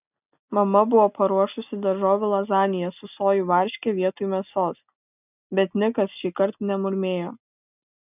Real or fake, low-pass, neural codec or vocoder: real; 3.6 kHz; none